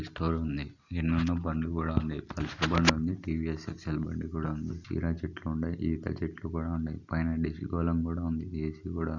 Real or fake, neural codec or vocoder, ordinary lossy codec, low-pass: real; none; none; 7.2 kHz